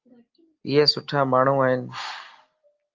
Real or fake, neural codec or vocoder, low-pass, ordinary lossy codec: real; none; 7.2 kHz; Opus, 32 kbps